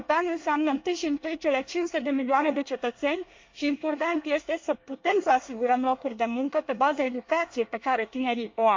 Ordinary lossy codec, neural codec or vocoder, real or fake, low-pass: MP3, 48 kbps; codec, 24 kHz, 1 kbps, SNAC; fake; 7.2 kHz